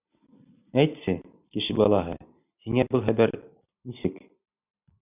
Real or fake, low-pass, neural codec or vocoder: fake; 3.6 kHz; vocoder, 44.1 kHz, 128 mel bands every 256 samples, BigVGAN v2